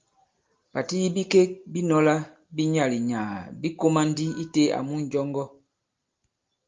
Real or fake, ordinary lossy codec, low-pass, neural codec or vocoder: real; Opus, 32 kbps; 7.2 kHz; none